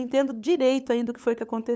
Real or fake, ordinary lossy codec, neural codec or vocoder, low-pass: fake; none; codec, 16 kHz, 8 kbps, FunCodec, trained on LibriTTS, 25 frames a second; none